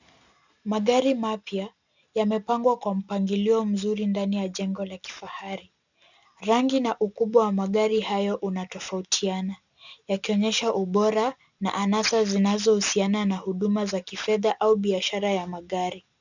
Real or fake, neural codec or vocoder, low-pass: real; none; 7.2 kHz